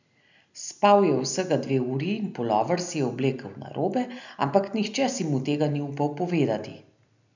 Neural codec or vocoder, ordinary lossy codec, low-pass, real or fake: none; none; 7.2 kHz; real